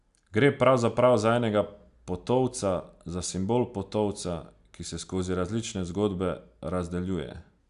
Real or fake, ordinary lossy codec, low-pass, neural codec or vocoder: real; none; 10.8 kHz; none